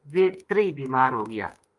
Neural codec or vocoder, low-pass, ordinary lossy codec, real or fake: autoencoder, 48 kHz, 32 numbers a frame, DAC-VAE, trained on Japanese speech; 10.8 kHz; Opus, 32 kbps; fake